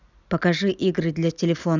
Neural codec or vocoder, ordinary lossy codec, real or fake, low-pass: none; none; real; 7.2 kHz